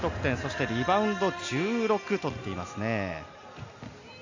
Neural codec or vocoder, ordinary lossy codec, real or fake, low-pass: none; AAC, 48 kbps; real; 7.2 kHz